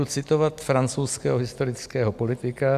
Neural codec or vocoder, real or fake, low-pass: none; real; 14.4 kHz